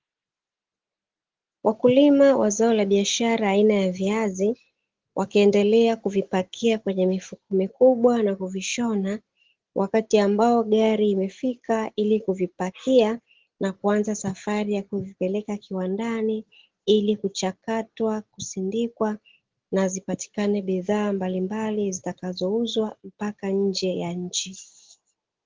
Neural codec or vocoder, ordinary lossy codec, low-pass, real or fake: none; Opus, 16 kbps; 7.2 kHz; real